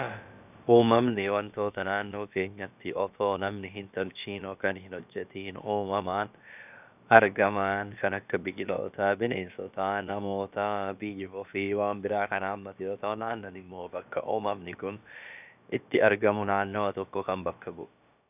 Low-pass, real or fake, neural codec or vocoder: 3.6 kHz; fake; codec, 16 kHz, about 1 kbps, DyCAST, with the encoder's durations